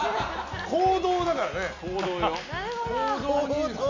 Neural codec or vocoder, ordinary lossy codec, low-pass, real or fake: none; MP3, 64 kbps; 7.2 kHz; real